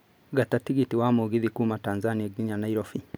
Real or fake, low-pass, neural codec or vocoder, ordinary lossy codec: real; none; none; none